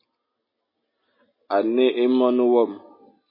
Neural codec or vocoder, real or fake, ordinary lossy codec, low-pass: none; real; MP3, 24 kbps; 5.4 kHz